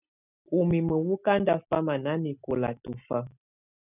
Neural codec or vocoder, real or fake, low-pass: none; real; 3.6 kHz